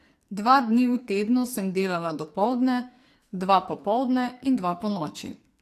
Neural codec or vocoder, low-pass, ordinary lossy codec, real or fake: codec, 44.1 kHz, 2.6 kbps, SNAC; 14.4 kHz; AAC, 64 kbps; fake